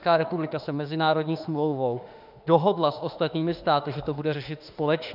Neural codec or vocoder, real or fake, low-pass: autoencoder, 48 kHz, 32 numbers a frame, DAC-VAE, trained on Japanese speech; fake; 5.4 kHz